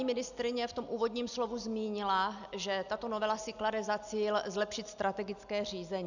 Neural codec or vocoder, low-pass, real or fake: none; 7.2 kHz; real